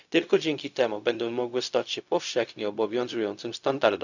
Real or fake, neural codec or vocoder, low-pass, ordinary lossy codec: fake; codec, 16 kHz, 0.4 kbps, LongCat-Audio-Codec; 7.2 kHz; none